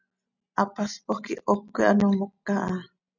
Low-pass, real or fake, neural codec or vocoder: 7.2 kHz; real; none